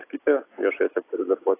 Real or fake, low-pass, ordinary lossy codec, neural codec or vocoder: real; 3.6 kHz; AAC, 24 kbps; none